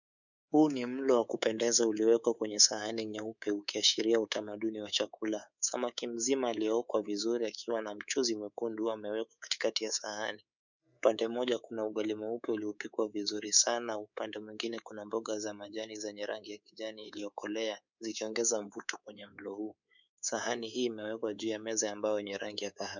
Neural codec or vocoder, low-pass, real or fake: codec, 24 kHz, 3.1 kbps, DualCodec; 7.2 kHz; fake